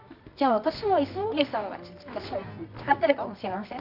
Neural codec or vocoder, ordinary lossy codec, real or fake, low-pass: codec, 24 kHz, 0.9 kbps, WavTokenizer, medium music audio release; none; fake; 5.4 kHz